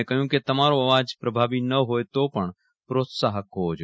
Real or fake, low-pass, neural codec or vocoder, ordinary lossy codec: real; none; none; none